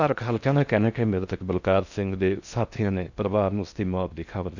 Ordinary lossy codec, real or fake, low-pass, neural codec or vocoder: none; fake; 7.2 kHz; codec, 16 kHz in and 24 kHz out, 0.6 kbps, FocalCodec, streaming, 4096 codes